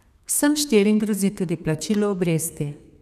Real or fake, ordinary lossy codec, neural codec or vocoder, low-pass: fake; none; codec, 32 kHz, 1.9 kbps, SNAC; 14.4 kHz